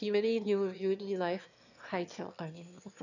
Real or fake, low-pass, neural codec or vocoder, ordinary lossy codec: fake; 7.2 kHz; autoencoder, 22.05 kHz, a latent of 192 numbers a frame, VITS, trained on one speaker; none